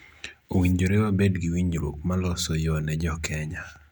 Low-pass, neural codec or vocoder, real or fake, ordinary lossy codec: 19.8 kHz; none; real; none